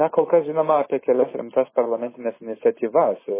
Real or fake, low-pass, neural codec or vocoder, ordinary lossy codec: real; 3.6 kHz; none; MP3, 16 kbps